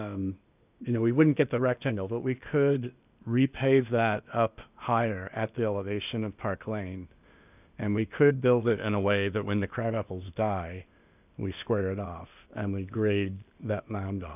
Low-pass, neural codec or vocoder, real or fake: 3.6 kHz; codec, 16 kHz, 0.8 kbps, ZipCodec; fake